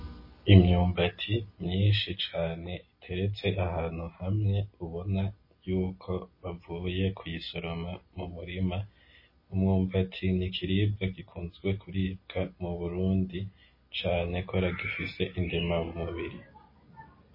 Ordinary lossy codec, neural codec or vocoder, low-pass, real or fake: MP3, 24 kbps; none; 5.4 kHz; real